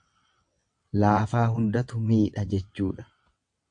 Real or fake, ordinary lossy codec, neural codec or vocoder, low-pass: fake; MP3, 48 kbps; vocoder, 22.05 kHz, 80 mel bands, WaveNeXt; 9.9 kHz